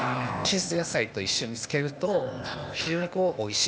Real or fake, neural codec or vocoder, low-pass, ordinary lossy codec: fake; codec, 16 kHz, 0.8 kbps, ZipCodec; none; none